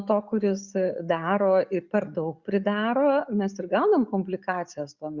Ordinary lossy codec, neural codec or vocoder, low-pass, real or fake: Opus, 64 kbps; none; 7.2 kHz; real